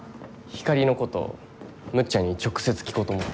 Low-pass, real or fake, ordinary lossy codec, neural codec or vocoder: none; real; none; none